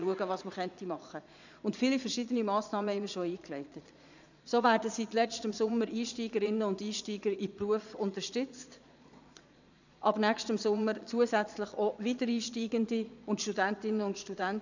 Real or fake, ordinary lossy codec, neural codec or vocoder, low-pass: fake; none; vocoder, 22.05 kHz, 80 mel bands, Vocos; 7.2 kHz